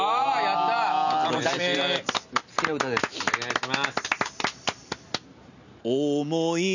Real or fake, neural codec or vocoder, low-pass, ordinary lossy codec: real; none; 7.2 kHz; none